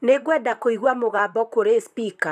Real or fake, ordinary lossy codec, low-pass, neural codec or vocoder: fake; none; 14.4 kHz; vocoder, 44.1 kHz, 128 mel bands, Pupu-Vocoder